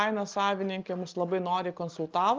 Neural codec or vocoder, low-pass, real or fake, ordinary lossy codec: none; 7.2 kHz; real; Opus, 24 kbps